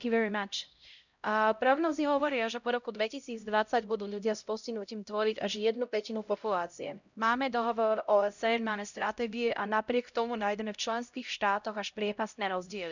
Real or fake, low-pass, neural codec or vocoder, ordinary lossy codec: fake; 7.2 kHz; codec, 16 kHz, 0.5 kbps, X-Codec, HuBERT features, trained on LibriSpeech; none